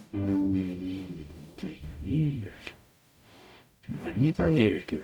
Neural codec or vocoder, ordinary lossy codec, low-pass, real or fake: codec, 44.1 kHz, 0.9 kbps, DAC; none; 19.8 kHz; fake